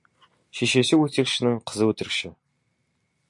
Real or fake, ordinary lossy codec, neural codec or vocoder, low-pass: real; AAC, 64 kbps; none; 10.8 kHz